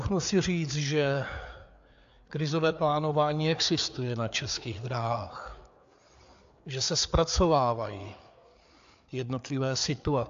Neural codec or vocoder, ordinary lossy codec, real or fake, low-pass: codec, 16 kHz, 4 kbps, FreqCodec, larger model; AAC, 64 kbps; fake; 7.2 kHz